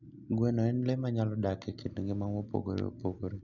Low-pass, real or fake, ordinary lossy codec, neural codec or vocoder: 7.2 kHz; real; none; none